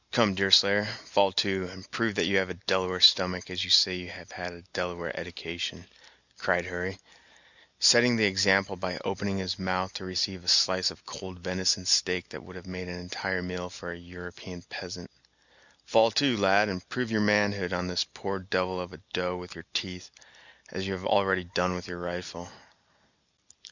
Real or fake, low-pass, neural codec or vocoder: real; 7.2 kHz; none